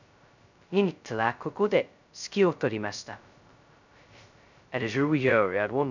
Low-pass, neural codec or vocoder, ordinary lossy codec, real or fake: 7.2 kHz; codec, 16 kHz, 0.2 kbps, FocalCodec; none; fake